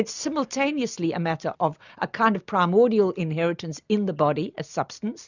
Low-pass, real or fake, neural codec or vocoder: 7.2 kHz; real; none